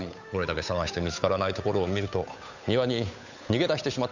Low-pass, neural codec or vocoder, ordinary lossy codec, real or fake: 7.2 kHz; codec, 16 kHz, 8 kbps, FunCodec, trained on Chinese and English, 25 frames a second; none; fake